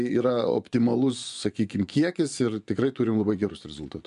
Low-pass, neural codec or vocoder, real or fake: 10.8 kHz; vocoder, 24 kHz, 100 mel bands, Vocos; fake